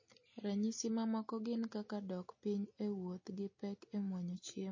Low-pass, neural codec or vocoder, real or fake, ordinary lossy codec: 7.2 kHz; none; real; MP3, 32 kbps